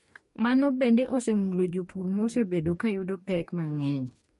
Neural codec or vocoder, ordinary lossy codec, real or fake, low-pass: codec, 44.1 kHz, 2.6 kbps, DAC; MP3, 48 kbps; fake; 14.4 kHz